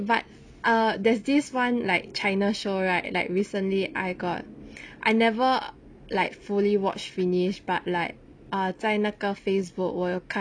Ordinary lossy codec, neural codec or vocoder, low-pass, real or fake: none; none; 9.9 kHz; real